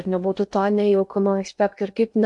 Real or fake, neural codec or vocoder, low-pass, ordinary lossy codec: fake; codec, 16 kHz in and 24 kHz out, 0.6 kbps, FocalCodec, streaming, 2048 codes; 10.8 kHz; MP3, 64 kbps